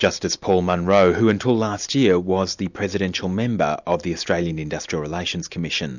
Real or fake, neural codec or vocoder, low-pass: real; none; 7.2 kHz